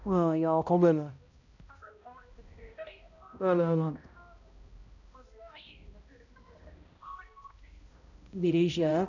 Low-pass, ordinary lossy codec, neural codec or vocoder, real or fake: 7.2 kHz; none; codec, 16 kHz, 0.5 kbps, X-Codec, HuBERT features, trained on balanced general audio; fake